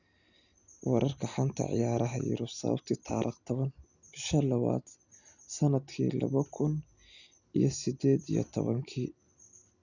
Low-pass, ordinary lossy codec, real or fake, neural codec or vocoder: 7.2 kHz; none; real; none